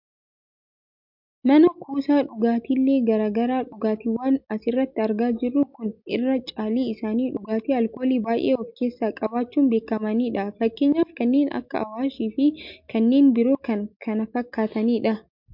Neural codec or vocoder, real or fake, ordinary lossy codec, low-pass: none; real; MP3, 48 kbps; 5.4 kHz